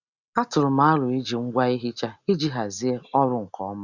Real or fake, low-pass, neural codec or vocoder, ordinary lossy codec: real; 7.2 kHz; none; Opus, 64 kbps